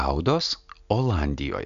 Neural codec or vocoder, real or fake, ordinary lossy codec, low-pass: none; real; MP3, 64 kbps; 7.2 kHz